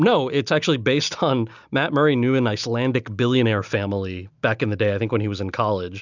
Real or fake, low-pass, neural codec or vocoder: real; 7.2 kHz; none